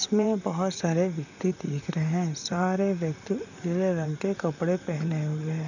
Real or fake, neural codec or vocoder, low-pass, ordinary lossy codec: fake; vocoder, 44.1 kHz, 128 mel bands, Pupu-Vocoder; 7.2 kHz; none